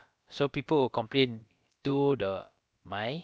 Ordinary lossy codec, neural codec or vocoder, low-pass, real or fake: none; codec, 16 kHz, about 1 kbps, DyCAST, with the encoder's durations; none; fake